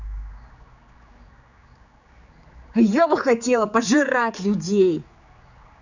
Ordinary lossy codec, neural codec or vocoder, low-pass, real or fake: none; codec, 16 kHz, 4 kbps, X-Codec, HuBERT features, trained on general audio; 7.2 kHz; fake